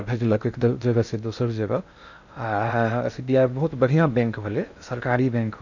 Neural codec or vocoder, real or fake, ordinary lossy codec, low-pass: codec, 16 kHz in and 24 kHz out, 0.6 kbps, FocalCodec, streaming, 2048 codes; fake; none; 7.2 kHz